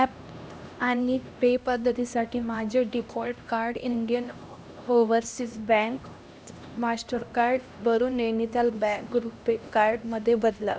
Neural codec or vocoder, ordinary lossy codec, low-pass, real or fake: codec, 16 kHz, 1 kbps, X-Codec, HuBERT features, trained on LibriSpeech; none; none; fake